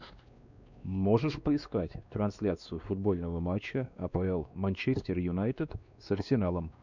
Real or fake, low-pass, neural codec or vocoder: fake; 7.2 kHz; codec, 16 kHz, 2 kbps, X-Codec, WavLM features, trained on Multilingual LibriSpeech